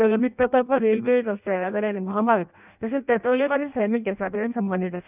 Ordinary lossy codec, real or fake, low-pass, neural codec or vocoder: none; fake; 3.6 kHz; codec, 16 kHz in and 24 kHz out, 0.6 kbps, FireRedTTS-2 codec